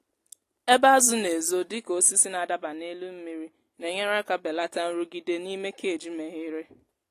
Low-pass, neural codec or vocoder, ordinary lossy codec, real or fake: 14.4 kHz; none; AAC, 48 kbps; real